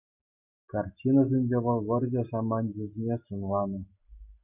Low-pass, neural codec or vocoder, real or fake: 3.6 kHz; none; real